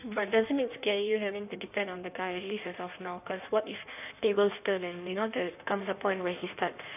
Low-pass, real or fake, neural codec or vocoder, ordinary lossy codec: 3.6 kHz; fake; codec, 16 kHz in and 24 kHz out, 1.1 kbps, FireRedTTS-2 codec; none